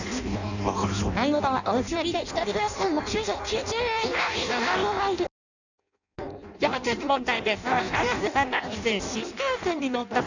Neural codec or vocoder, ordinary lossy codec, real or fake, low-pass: codec, 16 kHz in and 24 kHz out, 0.6 kbps, FireRedTTS-2 codec; none; fake; 7.2 kHz